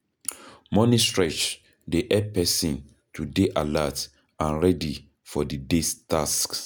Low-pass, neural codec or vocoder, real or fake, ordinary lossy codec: none; none; real; none